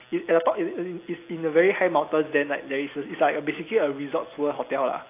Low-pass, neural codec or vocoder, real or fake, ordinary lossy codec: 3.6 kHz; none; real; AAC, 24 kbps